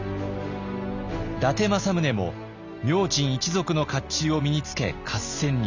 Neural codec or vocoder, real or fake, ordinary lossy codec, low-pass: none; real; none; 7.2 kHz